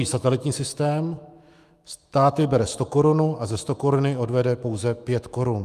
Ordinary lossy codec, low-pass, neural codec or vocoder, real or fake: Opus, 32 kbps; 14.4 kHz; autoencoder, 48 kHz, 128 numbers a frame, DAC-VAE, trained on Japanese speech; fake